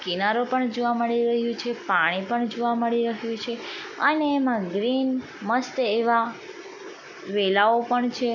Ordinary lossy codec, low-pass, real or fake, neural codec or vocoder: none; 7.2 kHz; real; none